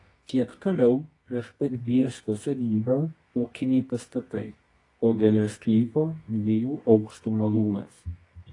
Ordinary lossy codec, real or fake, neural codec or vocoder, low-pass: AAC, 32 kbps; fake; codec, 24 kHz, 0.9 kbps, WavTokenizer, medium music audio release; 10.8 kHz